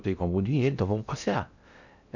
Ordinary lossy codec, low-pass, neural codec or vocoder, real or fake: none; 7.2 kHz; codec, 16 kHz in and 24 kHz out, 0.6 kbps, FocalCodec, streaming, 2048 codes; fake